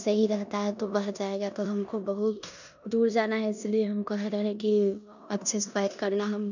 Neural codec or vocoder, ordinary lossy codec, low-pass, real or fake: codec, 16 kHz in and 24 kHz out, 0.9 kbps, LongCat-Audio-Codec, four codebook decoder; none; 7.2 kHz; fake